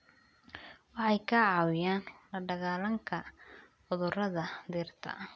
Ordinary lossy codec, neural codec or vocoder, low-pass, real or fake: none; none; none; real